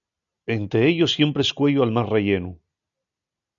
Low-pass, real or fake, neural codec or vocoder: 7.2 kHz; real; none